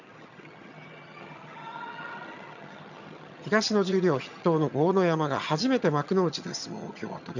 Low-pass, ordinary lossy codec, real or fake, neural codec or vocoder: 7.2 kHz; none; fake; vocoder, 22.05 kHz, 80 mel bands, HiFi-GAN